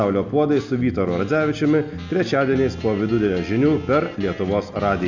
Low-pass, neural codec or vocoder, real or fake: 7.2 kHz; none; real